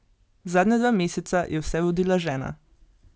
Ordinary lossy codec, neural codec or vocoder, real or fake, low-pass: none; none; real; none